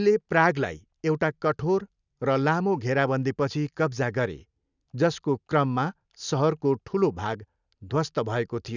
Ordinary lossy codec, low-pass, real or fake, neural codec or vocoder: none; 7.2 kHz; real; none